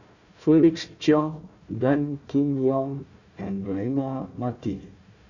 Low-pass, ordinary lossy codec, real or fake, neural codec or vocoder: 7.2 kHz; none; fake; codec, 16 kHz, 1 kbps, FunCodec, trained on Chinese and English, 50 frames a second